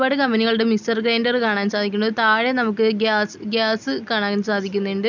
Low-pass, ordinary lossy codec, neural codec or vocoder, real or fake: 7.2 kHz; none; none; real